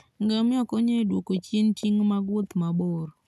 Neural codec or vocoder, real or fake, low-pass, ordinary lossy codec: none; real; 14.4 kHz; none